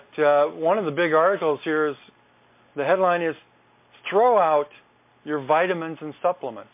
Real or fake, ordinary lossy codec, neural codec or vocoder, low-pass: real; MP3, 24 kbps; none; 3.6 kHz